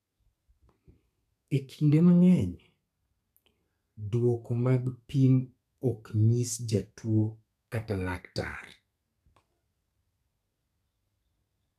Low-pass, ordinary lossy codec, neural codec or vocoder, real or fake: 14.4 kHz; none; codec, 32 kHz, 1.9 kbps, SNAC; fake